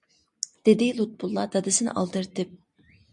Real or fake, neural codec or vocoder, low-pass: real; none; 10.8 kHz